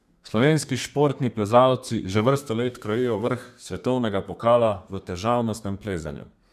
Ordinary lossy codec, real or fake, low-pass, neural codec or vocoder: none; fake; 14.4 kHz; codec, 32 kHz, 1.9 kbps, SNAC